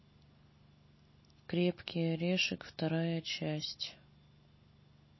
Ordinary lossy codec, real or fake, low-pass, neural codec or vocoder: MP3, 24 kbps; real; 7.2 kHz; none